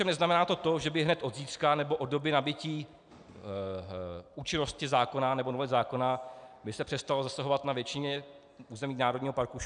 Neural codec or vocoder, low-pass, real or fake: none; 9.9 kHz; real